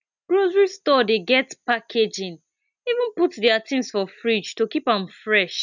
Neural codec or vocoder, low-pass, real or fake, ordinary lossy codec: none; 7.2 kHz; real; none